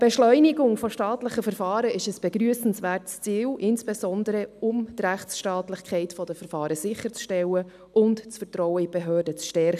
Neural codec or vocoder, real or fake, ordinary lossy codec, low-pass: none; real; none; 14.4 kHz